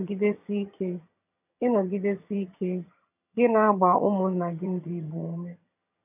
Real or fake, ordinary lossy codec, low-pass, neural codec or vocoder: fake; none; 3.6 kHz; vocoder, 22.05 kHz, 80 mel bands, HiFi-GAN